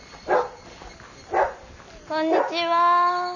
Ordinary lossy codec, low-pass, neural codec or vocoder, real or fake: none; 7.2 kHz; none; real